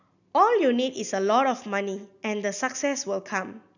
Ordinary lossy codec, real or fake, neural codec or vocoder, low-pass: none; real; none; 7.2 kHz